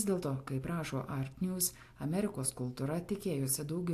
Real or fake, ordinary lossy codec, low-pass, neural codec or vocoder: real; AAC, 64 kbps; 14.4 kHz; none